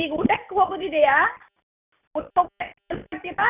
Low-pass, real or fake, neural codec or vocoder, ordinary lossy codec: 3.6 kHz; real; none; none